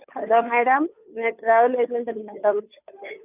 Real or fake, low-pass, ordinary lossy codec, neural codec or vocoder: fake; 3.6 kHz; none; codec, 16 kHz, 2 kbps, FunCodec, trained on Chinese and English, 25 frames a second